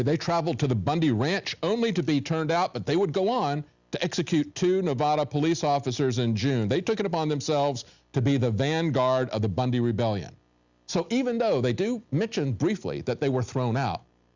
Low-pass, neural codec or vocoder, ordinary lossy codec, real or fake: 7.2 kHz; none; Opus, 64 kbps; real